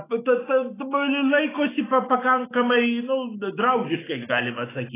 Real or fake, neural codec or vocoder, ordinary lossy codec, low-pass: fake; autoencoder, 48 kHz, 128 numbers a frame, DAC-VAE, trained on Japanese speech; AAC, 16 kbps; 3.6 kHz